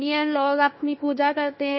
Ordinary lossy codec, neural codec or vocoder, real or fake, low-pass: MP3, 24 kbps; codec, 16 kHz, 1 kbps, FunCodec, trained on Chinese and English, 50 frames a second; fake; 7.2 kHz